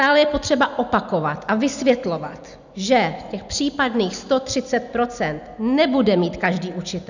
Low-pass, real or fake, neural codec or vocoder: 7.2 kHz; real; none